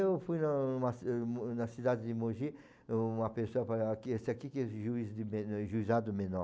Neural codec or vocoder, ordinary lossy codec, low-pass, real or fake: none; none; none; real